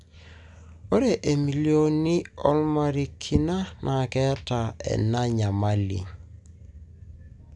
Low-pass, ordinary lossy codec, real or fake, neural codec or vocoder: 10.8 kHz; none; real; none